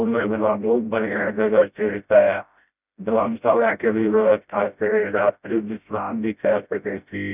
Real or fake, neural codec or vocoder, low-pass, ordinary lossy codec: fake; codec, 16 kHz, 0.5 kbps, FreqCodec, smaller model; 3.6 kHz; none